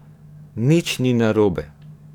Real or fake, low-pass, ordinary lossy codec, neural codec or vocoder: fake; 19.8 kHz; none; codec, 44.1 kHz, 7.8 kbps, DAC